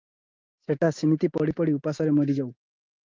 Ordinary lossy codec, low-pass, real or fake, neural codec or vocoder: Opus, 24 kbps; 7.2 kHz; real; none